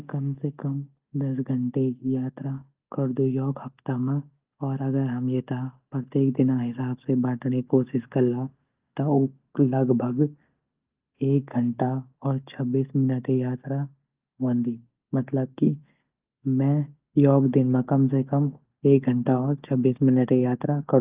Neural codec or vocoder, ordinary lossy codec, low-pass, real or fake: none; Opus, 32 kbps; 3.6 kHz; real